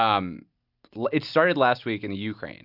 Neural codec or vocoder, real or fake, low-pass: none; real; 5.4 kHz